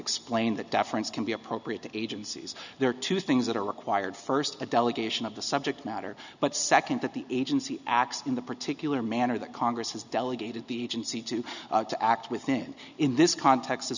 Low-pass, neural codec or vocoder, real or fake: 7.2 kHz; none; real